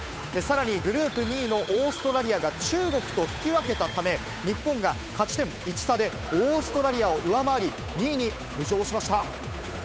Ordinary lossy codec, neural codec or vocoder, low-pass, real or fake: none; codec, 16 kHz, 8 kbps, FunCodec, trained on Chinese and English, 25 frames a second; none; fake